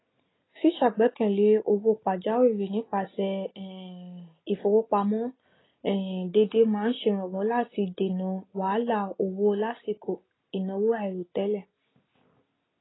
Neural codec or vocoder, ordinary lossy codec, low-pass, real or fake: none; AAC, 16 kbps; 7.2 kHz; real